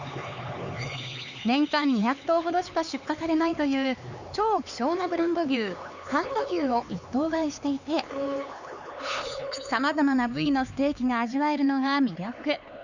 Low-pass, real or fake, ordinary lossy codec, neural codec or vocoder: 7.2 kHz; fake; Opus, 64 kbps; codec, 16 kHz, 4 kbps, X-Codec, HuBERT features, trained on LibriSpeech